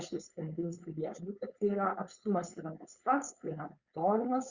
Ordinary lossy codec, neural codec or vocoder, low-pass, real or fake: Opus, 64 kbps; codec, 16 kHz, 4.8 kbps, FACodec; 7.2 kHz; fake